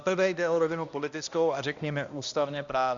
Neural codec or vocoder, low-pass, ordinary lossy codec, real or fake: codec, 16 kHz, 1 kbps, X-Codec, HuBERT features, trained on balanced general audio; 7.2 kHz; Opus, 64 kbps; fake